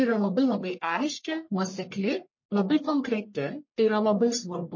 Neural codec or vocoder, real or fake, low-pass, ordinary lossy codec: codec, 44.1 kHz, 1.7 kbps, Pupu-Codec; fake; 7.2 kHz; MP3, 32 kbps